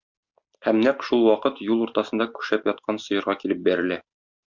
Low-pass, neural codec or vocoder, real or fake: 7.2 kHz; none; real